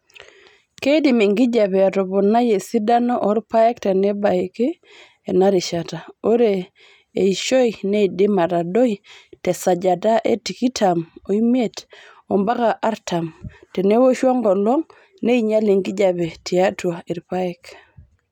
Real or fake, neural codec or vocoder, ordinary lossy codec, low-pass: real; none; none; 19.8 kHz